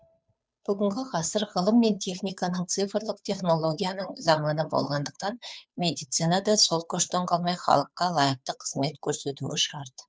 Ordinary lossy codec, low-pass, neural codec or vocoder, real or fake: none; none; codec, 16 kHz, 2 kbps, FunCodec, trained on Chinese and English, 25 frames a second; fake